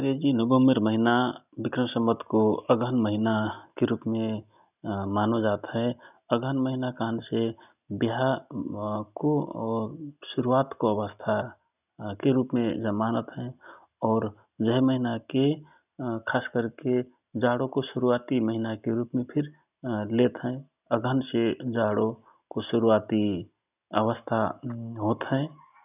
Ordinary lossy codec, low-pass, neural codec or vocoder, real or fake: none; 3.6 kHz; none; real